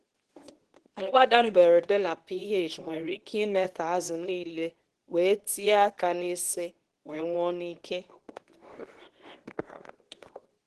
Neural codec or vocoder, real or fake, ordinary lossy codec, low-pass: codec, 24 kHz, 0.9 kbps, WavTokenizer, medium speech release version 2; fake; Opus, 16 kbps; 10.8 kHz